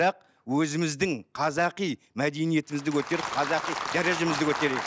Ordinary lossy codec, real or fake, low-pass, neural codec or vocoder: none; real; none; none